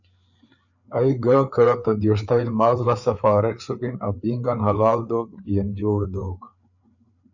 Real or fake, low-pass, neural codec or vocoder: fake; 7.2 kHz; codec, 16 kHz, 4 kbps, FreqCodec, larger model